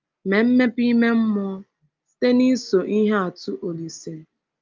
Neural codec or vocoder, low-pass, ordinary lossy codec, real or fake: none; 7.2 kHz; Opus, 24 kbps; real